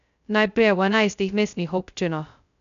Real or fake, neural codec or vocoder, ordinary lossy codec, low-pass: fake; codec, 16 kHz, 0.2 kbps, FocalCodec; none; 7.2 kHz